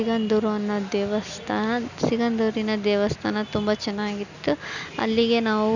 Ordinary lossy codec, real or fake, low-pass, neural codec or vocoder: none; real; 7.2 kHz; none